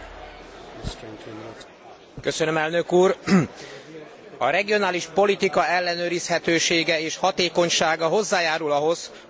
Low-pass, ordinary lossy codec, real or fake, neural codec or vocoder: none; none; real; none